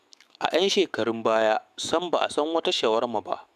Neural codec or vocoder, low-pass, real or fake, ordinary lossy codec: autoencoder, 48 kHz, 128 numbers a frame, DAC-VAE, trained on Japanese speech; 14.4 kHz; fake; none